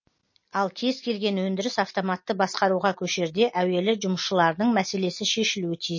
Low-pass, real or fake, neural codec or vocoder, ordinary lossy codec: 7.2 kHz; real; none; MP3, 32 kbps